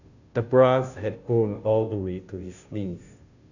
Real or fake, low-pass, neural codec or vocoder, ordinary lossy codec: fake; 7.2 kHz; codec, 16 kHz, 0.5 kbps, FunCodec, trained on Chinese and English, 25 frames a second; none